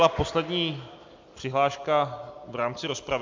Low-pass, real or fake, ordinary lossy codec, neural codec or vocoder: 7.2 kHz; real; MP3, 64 kbps; none